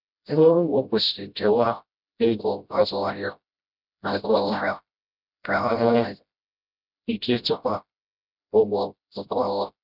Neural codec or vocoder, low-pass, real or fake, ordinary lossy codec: codec, 16 kHz, 0.5 kbps, FreqCodec, smaller model; 5.4 kHz; fake; none